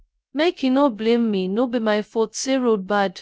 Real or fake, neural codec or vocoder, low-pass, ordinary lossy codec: fake; codec, 16 kHz, 0.2 kbps, FocalCodec; none; none